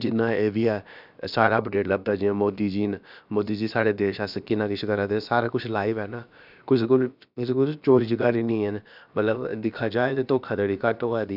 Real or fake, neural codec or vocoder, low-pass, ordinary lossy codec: fake; codec, 16 kHz, about 1 kbps, DyCAST, with the encoder's durations; 5.4 kHz; none